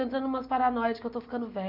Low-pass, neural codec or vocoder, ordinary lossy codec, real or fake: 5.4 kHz; none; none; real